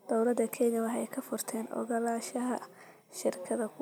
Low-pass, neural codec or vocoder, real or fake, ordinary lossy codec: none; none; real; none